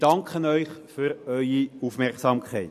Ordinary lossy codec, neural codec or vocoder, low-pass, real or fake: MP3, 64 kbps; none; 14.4 kHz; real